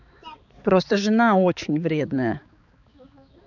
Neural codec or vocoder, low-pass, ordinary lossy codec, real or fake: codec, 16 kHz, 4 kbps, X-Codec, HuBERT features, trained on balanced general audio; 7.2 kHz; none; fake